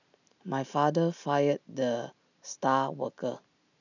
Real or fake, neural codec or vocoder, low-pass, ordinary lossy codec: real; none; 7.2 kHz; none